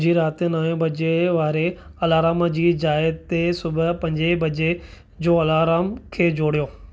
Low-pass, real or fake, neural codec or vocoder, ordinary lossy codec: none; real; none; none